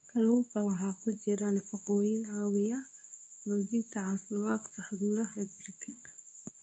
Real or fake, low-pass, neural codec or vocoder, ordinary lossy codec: fake; 9.9 kHz; codec, 24 kHz, 0.9 kbps, WavTokenizer, medium speech release version 2; none